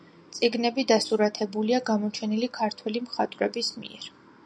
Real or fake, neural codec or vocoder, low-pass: real; none; 9.9 kHz